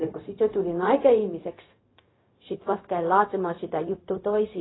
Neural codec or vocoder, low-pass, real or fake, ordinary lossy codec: codec, 16 kHz, 0.4 kbps, LongCat-Audio-Codec; 7.2 kHz; fake; AAC, 16 kbps